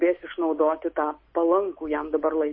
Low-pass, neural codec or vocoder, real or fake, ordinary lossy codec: 7.2 kHz; none; real; MP3, 24 kbps